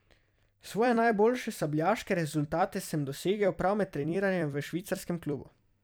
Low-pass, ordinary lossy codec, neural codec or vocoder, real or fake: none; none; vocoder, 44.1 kHz, 128 mel bands every 256 samples, BigVGAN v2; fake